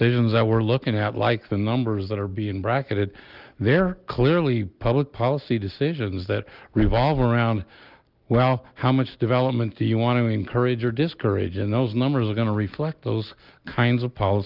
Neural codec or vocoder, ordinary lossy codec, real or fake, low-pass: none; Opus, 32 kbps; real; 5.4 kHz